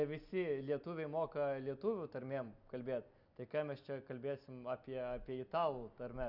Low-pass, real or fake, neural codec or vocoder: 5.4 kHz; real; none